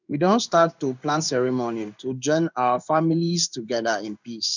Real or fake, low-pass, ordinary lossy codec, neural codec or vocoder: fake; 7.2 kHz; none; codec, 16 kHz in and 24 kHz out, 1 kbps, XY-Tokenizer